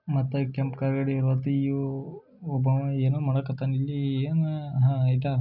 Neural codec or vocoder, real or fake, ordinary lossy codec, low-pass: none; real; none; 5.4 kHz